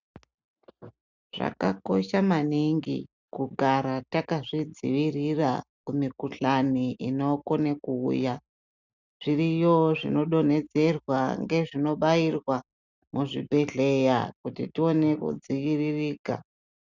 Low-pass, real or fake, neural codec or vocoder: 7.2 kHz; real; none